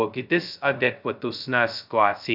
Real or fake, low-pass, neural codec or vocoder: fake; 5.4 kHz; codec, 16 kHz, 0.2 kbps, FocalCodec